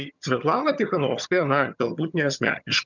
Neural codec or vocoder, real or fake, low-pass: vocoder, 22.05 kHz, 80 mel bands, HiFi-GAN; fake; 7.2 kHz